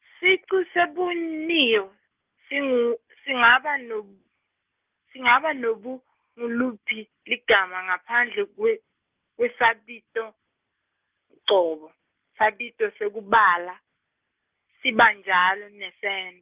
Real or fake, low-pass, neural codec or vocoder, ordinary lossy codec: real; 3.6 kHz; none; Opus, 24 kbps